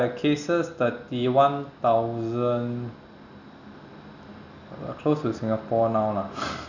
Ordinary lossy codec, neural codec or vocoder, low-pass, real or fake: none; none; 7.2 kHz; real